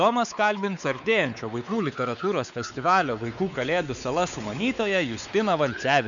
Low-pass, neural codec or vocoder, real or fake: 7.2 kHz; codec, 16 kHz, 4 kbps, X-Codec, WavLM features, trained on Multilingual LibriSpeech; fake